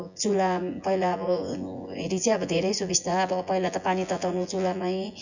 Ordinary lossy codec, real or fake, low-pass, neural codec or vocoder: Opus, 64 kbps; fake; 7.2 kHz; vocoder, 24 kHz, 100 mel bands, Vocos